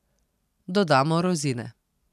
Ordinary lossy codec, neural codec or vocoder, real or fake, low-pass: none; none; real; 14.4 kHz